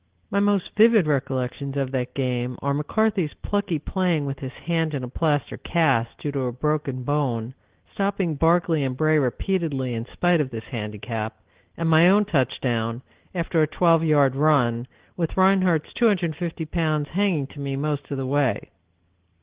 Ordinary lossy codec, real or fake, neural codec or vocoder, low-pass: Opus, 16 kbps; real; none; 3.6 kHz